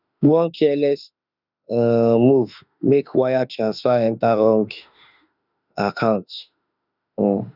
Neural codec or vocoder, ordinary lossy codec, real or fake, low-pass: autoencoder, 48 kHz, 32 numbers a frame, DAC-VAE, trained on Japanese speech; none; fake; 5.4 kHz